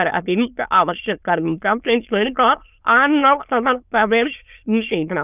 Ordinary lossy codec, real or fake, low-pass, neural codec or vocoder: none; fake; 3.6 kHz; autoencoder, 22.05 kHz, a latent of 192 numbers a frame, VITS, trained on many speakers